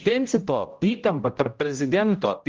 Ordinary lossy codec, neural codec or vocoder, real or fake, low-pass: Opus, 32 kbps; codec, 16 kHz, 0.5 kbps, X-Codec, HuBERT features, trained on general audio; fake; 7.2 kHz